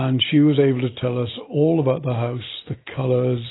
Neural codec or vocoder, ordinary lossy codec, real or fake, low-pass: none; AAC, 16 kbps; real; 7.2 kHz